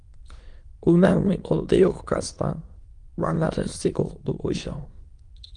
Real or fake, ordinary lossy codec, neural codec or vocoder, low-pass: fake; Opus, 24 kbps; autoencoder, 22.05 kHz, a latent of 192 numbers a frame, VITS, trained on many speakers; 9.9 kHz